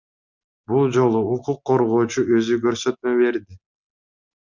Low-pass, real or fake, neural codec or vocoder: 7.2 kHz; real; none